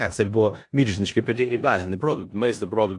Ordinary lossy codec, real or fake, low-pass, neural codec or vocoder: AAC, 64 kbps; fake; 10.8 kHz; codec, 16 kHz in and 24 kHz out, 0.9 kbps, LongCat-Audio-Codec, four codebook decoder